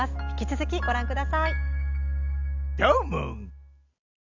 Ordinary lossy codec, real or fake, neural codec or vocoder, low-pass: none; real; none; 7.2 kHz